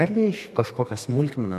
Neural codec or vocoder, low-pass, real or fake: codec, 32 kHz, 1.9 kbps, SNAC; 14.4 kHz; fake